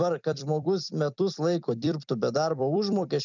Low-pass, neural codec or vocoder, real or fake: 7.2 kHz; vocoder, 44.1 kHz, 128 mel bands every 256 samples, BigVGAN v2; fake